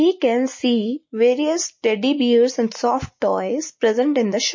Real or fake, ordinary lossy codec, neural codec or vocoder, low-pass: real; MP3, 32 kbps; none; 7.2 kHz